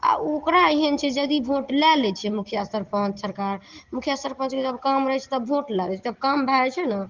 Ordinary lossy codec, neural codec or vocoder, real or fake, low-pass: Opus, 16 kbps; none; real; 7.2 kHz